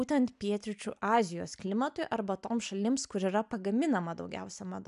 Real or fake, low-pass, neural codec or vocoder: real; 10.8 kHz; none